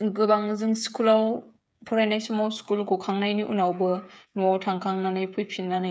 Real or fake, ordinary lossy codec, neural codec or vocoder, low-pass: fake; none; codec, 16 kHz, 8 kbps, FreqCodec, smaller model; none